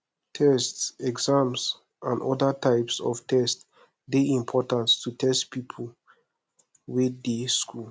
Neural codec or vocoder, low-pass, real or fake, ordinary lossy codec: none; none; real; none